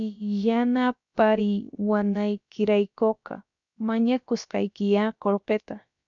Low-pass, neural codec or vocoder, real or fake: 7.2 kHz; codec, 16 kHz, about 1 kbps, DyCAST, with the encoder's durations; fake